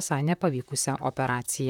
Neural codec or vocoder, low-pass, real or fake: vocoder, 44.1 kHz, 128 mel bands, Pupu-Vocoder; 19.8 kHz; fake